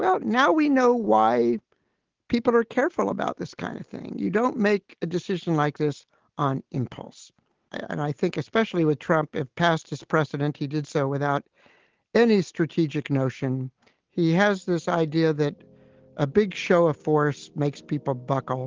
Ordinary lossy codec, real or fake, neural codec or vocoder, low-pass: Opus, 16 kbps; real; none; 7.2 kHz